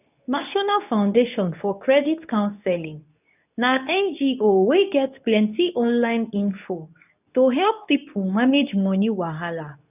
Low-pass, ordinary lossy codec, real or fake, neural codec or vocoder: 3.6 kHz; none; fake; codec, 24 kHz, 0.9 kbps, WavTokenizer, medium speech release version 2